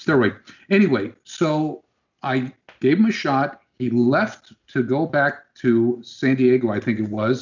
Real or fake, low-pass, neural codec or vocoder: real; 7.2 kHz; none